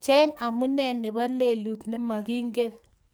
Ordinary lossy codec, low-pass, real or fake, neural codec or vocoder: none; none; fake; codec, 44.1 kHz, 2.6 kbps, SNAC